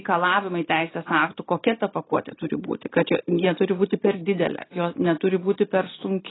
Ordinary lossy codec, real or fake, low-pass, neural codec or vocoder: AAC, 16 kbps; real; 7.2 kHz; none